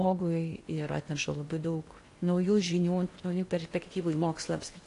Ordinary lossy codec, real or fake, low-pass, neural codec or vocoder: AAC, 48 kbps; fake; 10.8 kHz; codec, 16 kHz in and 24 kHz out, 0.8 kbps, FocalCodec, streaming, 65536 codes